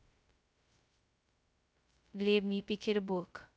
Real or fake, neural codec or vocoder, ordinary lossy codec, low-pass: fake; codec, 16 kHz, 0.2 kbps, FocalCodec; none; none